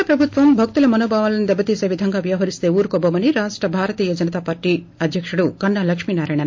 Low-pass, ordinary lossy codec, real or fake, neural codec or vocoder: 7.2 kHz; MP3, 48 kbps; real; none